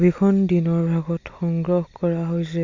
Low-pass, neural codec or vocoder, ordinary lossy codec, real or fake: 7.2 kHz; none; Opus, 64 kbps; real